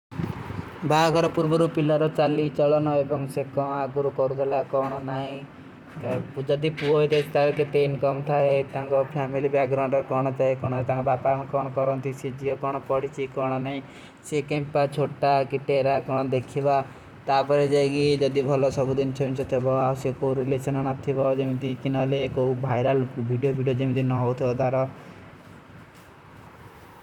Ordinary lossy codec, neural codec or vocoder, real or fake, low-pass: none; vocoder, 44.1 kHz, 128 mel bands, Pupu-Vocoder; fake; 19.8 kHz